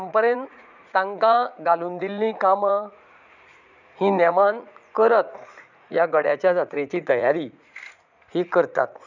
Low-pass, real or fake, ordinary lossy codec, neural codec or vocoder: 7.2 kHz; fake; none; vocoder, 44.1 kHz, 80 mel bands, Vocos